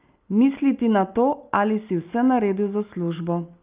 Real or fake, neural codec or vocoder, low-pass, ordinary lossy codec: real; none; 3.6 kHz; Opus, 24 kbps